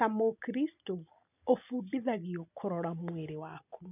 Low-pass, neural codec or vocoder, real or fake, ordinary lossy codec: 3.6 kHz; none; real; none